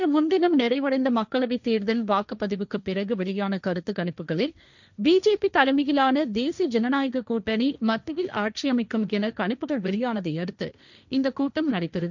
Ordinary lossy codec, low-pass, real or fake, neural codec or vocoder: none; 7.2 kHz; fake; codec, 16 kHz, 1.1 kbps, Voila-Tokenizer